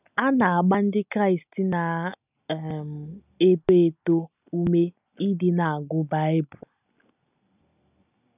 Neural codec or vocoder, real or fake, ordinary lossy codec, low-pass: none; real; none; 3.6 kHz